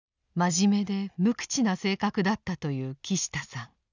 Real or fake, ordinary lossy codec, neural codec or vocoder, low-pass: real; none; none; 7.2 kHz